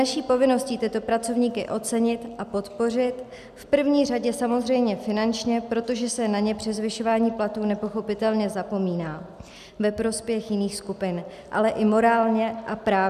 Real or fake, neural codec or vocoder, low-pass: real; none; 14.4 kHz